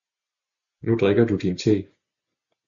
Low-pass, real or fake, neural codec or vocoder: 7.2 kHz; real; none